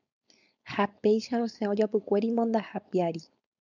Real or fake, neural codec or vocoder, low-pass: fake; codec, 16 kHz, 4.8 kbps, FACodec; 7.2 kHz